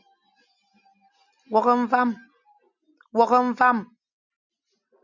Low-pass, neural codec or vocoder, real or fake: 7.2 kHz; none; real